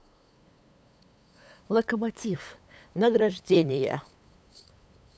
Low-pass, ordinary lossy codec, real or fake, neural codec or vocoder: none; none; fake; codec, 16 kHz, 8 kbps, FunCodec, trained on LibriTTS, 25 frames a second